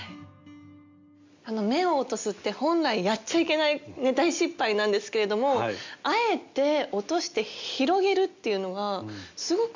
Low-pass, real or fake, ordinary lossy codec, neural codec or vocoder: 7.2 kHz; real; MP3, 64 kbps; none